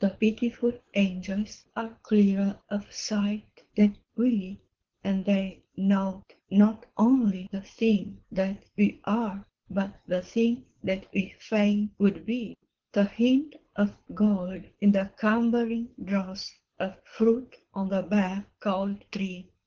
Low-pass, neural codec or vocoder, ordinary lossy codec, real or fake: 7.2 kHz; codec, 24 kHz, 6 kbps, HILCodec; Opus, 16 kbps; fake